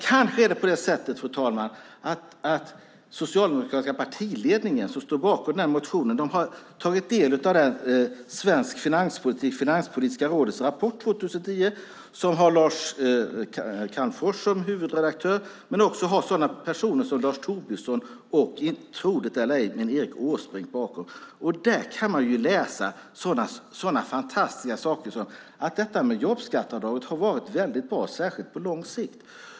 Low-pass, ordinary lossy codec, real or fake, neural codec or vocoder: none; none; real; none